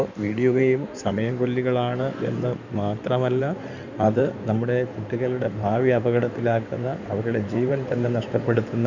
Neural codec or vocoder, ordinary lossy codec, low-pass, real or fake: codec, 16 kHz in and 24 kHz out, 2.2 kbps, FireRedTTS-2 codec; none; 7.2 kHz; fake